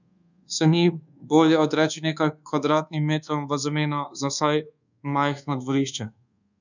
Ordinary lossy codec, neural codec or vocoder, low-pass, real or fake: none; codec, 24 kHz, 1.2 kbps, DualCodec; 7.2 kHz; fake